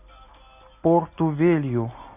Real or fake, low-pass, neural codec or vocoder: real; 3.6 kHz; none